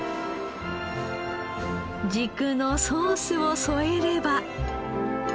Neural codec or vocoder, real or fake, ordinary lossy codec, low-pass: none; real; none; none